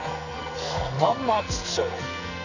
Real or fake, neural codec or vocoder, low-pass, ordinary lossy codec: fake; codec, 44.1 kHz, 2.6 kbps, SNAC; 7.2 kHz; none